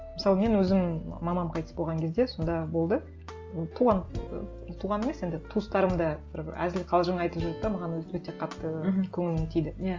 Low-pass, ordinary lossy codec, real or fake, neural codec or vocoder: 7.2 kHz; Opus, 32 kbps; real; none